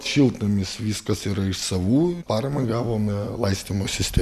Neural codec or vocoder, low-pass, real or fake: none; 14.4 kHz; real